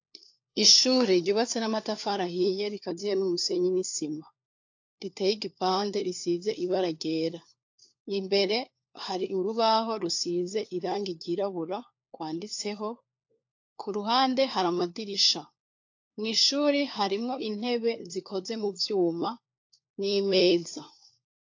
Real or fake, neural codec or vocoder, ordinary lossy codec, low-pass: fake; codec, 16 kHz, 4 kbps, FunCodec, trained on LibriTTS, 50 frames a second; AAC, 48 kbps; 7.2 kHz